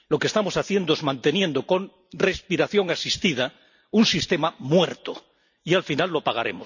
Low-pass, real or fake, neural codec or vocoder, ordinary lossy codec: 7.2 kHz; real; none; none